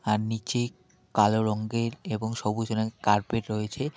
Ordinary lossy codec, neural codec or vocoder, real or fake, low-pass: none; none; real; none